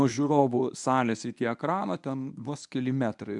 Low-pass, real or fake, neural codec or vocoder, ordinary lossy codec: 10.8 kHz; fake; codec, 24 kHz, 0.9 kbps, WavTokenizer, medium speech release version 1; MP3, 96 kbps